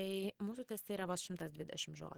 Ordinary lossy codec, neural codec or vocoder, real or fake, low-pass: Opus, 16 kbps; none; real; 19.8 kHz